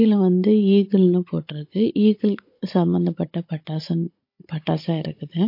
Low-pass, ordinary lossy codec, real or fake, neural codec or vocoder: 5.4 kHz; MP3, 32 kbps; fake; codec, 16 kHz, 16 kbps, FunCodec, trained on Chinese and English, 50 frames a second